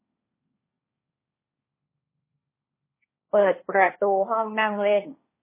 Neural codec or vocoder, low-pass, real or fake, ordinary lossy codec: codec, 16 kHz, 1.1 kbps, Voila-Tokenizer; 3.6 kHz; fake; MP3, 16 kbps